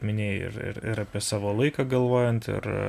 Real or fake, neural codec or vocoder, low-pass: real; none; 14.4 kHz